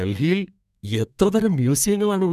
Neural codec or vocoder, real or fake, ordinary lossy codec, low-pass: codec, 32 kHz, 1.9 kbps, SNAC; fake; none; 14.4 kHz